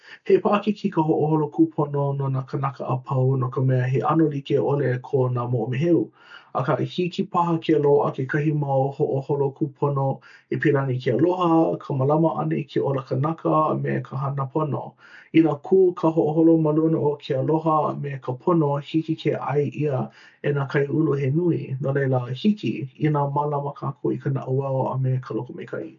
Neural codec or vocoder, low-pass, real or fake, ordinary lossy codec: none; 7.2 kHz; real; none